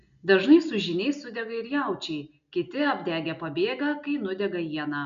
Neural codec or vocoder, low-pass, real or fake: none; 7.2 kHz; real